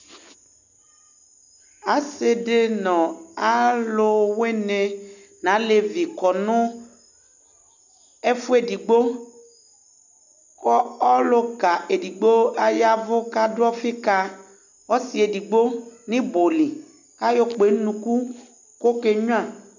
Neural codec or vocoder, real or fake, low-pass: none; real; 7.2 kHz